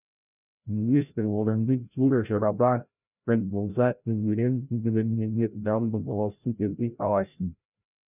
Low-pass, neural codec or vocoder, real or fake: 3.6 kHz; codec, 16 kHz, 0.5 kbps, FreqCodec, larger model; fake